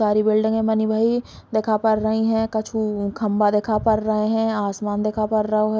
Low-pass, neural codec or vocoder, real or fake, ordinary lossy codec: none; none; real; none